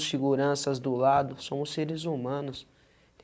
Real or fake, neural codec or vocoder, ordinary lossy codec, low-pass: real; none; none; none